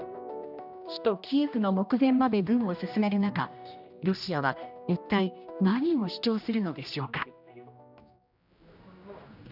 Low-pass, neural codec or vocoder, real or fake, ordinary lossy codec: 5.4 kHz; codec, 16 kHz, 1 kbps, X-Codec, HuBERT features, trained on general audio; fake; none